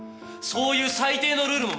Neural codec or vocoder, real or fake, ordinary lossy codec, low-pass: none; real; none; none